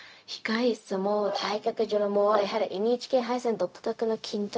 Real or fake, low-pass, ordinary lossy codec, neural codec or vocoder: fake; none; none; codec, 16 kHz, 0.4 kbps, LongCat-Audio-Codec